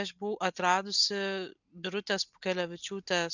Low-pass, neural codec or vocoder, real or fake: 7.2 kHz; none; real